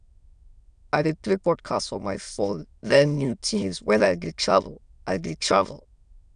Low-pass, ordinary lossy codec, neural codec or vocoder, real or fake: 9.9 kHz; none; autoencoder, 22.05 kHz, a latent of 192 numbers a frame, VITS, trained on many speakers; fake